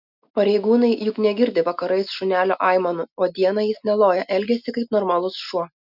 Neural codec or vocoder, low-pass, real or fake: none; 5.4 kHz; real